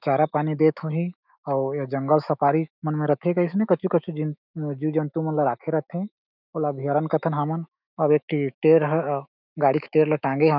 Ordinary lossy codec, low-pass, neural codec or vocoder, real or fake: none; 5.4 kHz; autoencoder, 48 kHz, 128 numbers a frame, DAC-VAE, trained on Japanese speech; fake